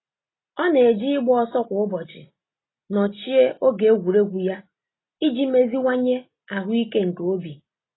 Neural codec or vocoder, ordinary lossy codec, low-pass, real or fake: none; AAC, 16 kbps; 7.2 kHz; real